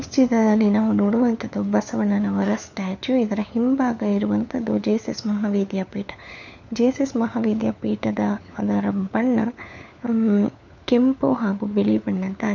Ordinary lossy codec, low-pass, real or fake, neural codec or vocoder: none; 7.2 kHz; fake; codec, 16 kHz, 4 kbps, FunCodec, trained on LibriTTS, 50 frames a second